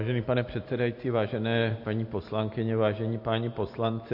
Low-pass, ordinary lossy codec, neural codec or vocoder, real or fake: 5.4 kHz; MP3, 32 kbps; none; real